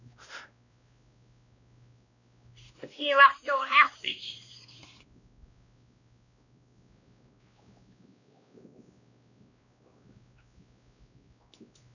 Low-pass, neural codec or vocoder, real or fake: 7.2 kHz; codec, 16 kHz, 1 kbps, X-Codec, WavLM features, trained on Multilingual LibriSpeech; fake